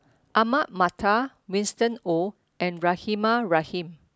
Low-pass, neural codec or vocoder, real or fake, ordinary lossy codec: none; none; real; none